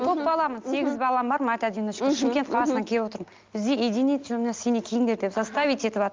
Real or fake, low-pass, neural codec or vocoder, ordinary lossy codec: real; 7.2 kHz; none; Opus, 24 kbps